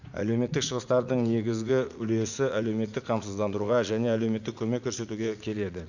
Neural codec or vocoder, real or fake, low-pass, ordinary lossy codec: vocoder, 44.1 kHz, 80 mel bands, Vocos; fake; 7.2 kHz; none